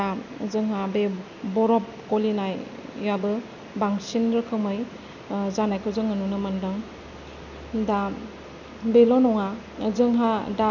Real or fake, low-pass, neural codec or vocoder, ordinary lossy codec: real; 7.2 kHz; none; none